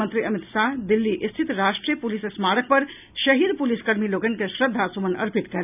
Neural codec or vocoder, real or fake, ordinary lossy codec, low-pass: none; real; none; 3.6 kHz